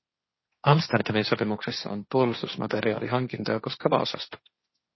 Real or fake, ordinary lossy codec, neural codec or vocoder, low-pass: fake; MP3, 24 kbps; codec, 16 kHz, 1.1 kbps, Voila-Tokenizer; 7.2 kHz